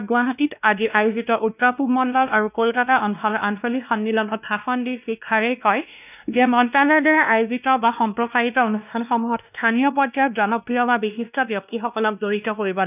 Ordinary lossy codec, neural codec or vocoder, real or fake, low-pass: none; codec, 16 kHz, 1 kbps, X-Codec, WavLM features, trained on Multilingual LibriSpeech; fake; 3.6 kHz